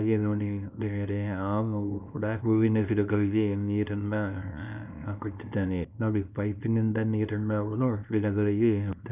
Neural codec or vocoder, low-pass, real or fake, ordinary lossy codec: codec, 24 kHz, 0.9 kbps, WavTokenizer, small release; 3.6 kHz; fake; none